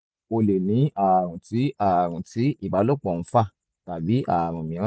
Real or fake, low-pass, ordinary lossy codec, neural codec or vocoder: real; none; none; none